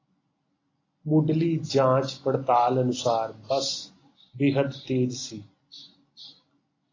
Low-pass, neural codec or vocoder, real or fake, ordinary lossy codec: 7.2 kHz; none; real; AAC, 32 kbps